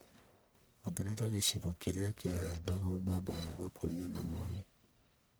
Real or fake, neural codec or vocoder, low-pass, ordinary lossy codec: fake; codec, 44.1 kHz, 1.7 kbps, Pupu-Codec; none; none